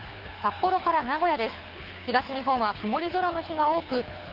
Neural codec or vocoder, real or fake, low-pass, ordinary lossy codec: codec, 24 kHz, 6 kbps, HILCodec; fake; 5.4 kHz; Opus, 32 kbps